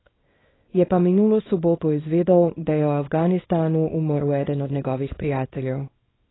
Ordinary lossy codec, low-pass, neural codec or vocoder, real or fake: AAC, 16 kbps; 7.2 kHz; codec, 24 kHz, 0.9 kbps, WavTokenizer, small release; fake